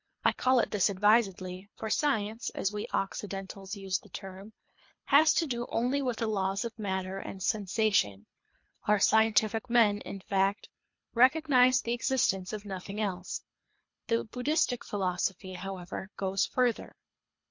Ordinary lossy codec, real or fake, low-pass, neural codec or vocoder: MP3, 48 kbps; fake; 7.2 kHz; codec, 24 kHz, 3 kbps, HILCodec